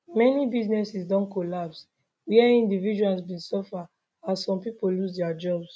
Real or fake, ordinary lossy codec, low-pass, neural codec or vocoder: real; none; none; none